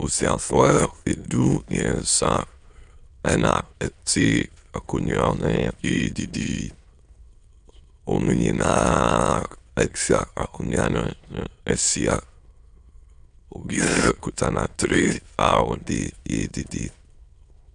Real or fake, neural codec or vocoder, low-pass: fake; autoencoder, 22.05 kHz, a latent of 192 numbers a frame, VITS, trained on many speakers; 9.9 kHz